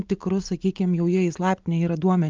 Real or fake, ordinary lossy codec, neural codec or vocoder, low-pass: real; Opus, 24 kbps; none; 7.2 kHz